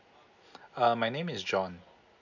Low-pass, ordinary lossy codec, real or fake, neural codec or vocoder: 7.2 kHz; none; real; none